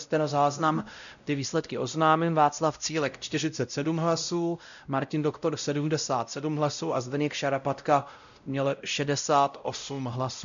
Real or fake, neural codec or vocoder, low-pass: fake; codec, 16 kHz, 0.5 kbps, X-Codec, WavLM features, trained on Multilingual LibriSpeech; 7.2 kHz